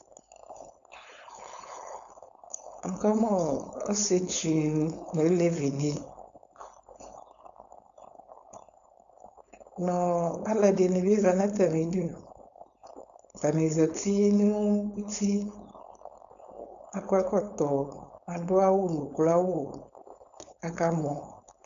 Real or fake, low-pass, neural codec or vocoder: fake; 7.2 kHz; codec, 16 kHz, 4.8 kbps, FACodec